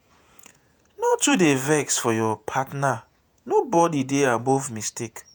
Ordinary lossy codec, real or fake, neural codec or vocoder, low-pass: none; fake; vocoder, 48 kHz, 128 mel bands, Vocos; none